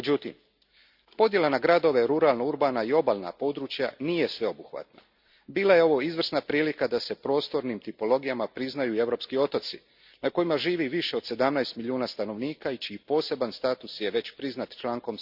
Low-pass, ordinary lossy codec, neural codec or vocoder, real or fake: 5.4 kHz; Opus, 64 kbps; none; real